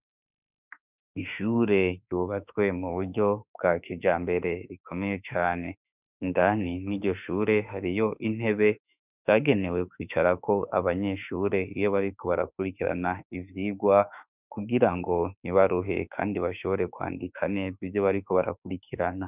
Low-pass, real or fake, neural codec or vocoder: 3.6 kHz; fake; autoencoder, 48 kHz, 32 numbers a frame, DAC-VAE, trained on Japanese speech